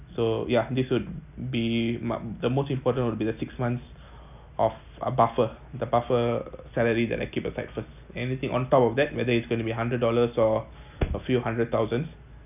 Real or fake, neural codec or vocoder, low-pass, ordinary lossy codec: real; none; 3.6 kHz; none